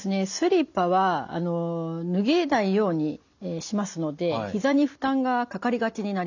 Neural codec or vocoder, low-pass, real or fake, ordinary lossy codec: none; 7.2 kHz; real; none